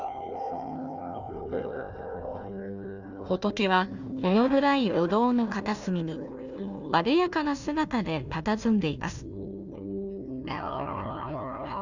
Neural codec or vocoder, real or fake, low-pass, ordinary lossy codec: codec, 16 kHz, 1 kbps, FunCodec, trained on Chinese and English, 50 frames a second; fake; 7.2 kHz; none